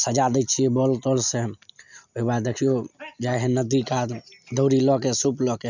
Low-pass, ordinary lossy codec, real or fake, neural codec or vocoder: 7.2 kHz; none; real; none